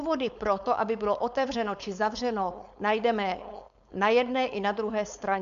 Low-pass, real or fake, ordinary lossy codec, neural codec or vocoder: 7.2 kHz; fake; AAC, 96 kbps; codec, 16 kHz, 4.8 kbps, FACodec